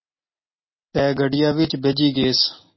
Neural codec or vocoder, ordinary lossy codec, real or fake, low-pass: none; MP3, 24 kbps; real; 7.2 kHz